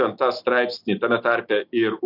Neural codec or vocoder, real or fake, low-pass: none; real; 5.4 kHz